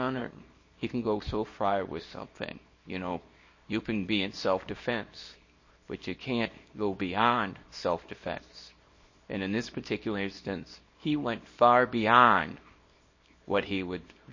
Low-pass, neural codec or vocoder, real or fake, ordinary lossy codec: 7.2 kHz; codec, 24 kHz, 0.9 kbps, WavTokenizer, small release; fake; MP3, 32 kbps